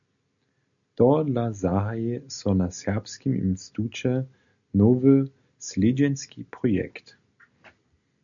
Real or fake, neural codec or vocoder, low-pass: real; none; 7.2 kHz